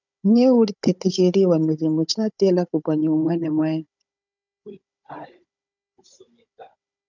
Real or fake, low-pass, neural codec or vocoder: fake; 7.2 kHz; codec, 16 kHz, 16 kbps, FunCodec, trained on Chinese and English, 50 frames a second